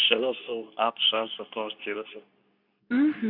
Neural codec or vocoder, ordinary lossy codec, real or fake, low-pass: codec, 24 kHz, 0.9 kbps, WavTokenizer, medium speech release version 2; none; fake; 5.4 kHz